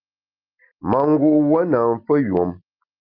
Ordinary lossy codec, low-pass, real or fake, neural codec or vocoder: Opus, 24 kbps; 5.4 kHz; real; none